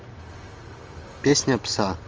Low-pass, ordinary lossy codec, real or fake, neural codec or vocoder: 7.2 kHz; Opus, 24 kbps; real; none